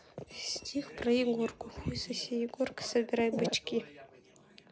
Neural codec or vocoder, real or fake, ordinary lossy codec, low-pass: none; real; none; none